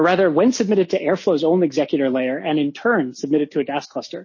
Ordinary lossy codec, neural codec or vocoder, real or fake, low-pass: MP3, 32 kbps; none; real; 7.2 kHz